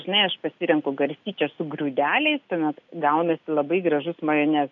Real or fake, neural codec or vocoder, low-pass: real; none; 7.2 kHz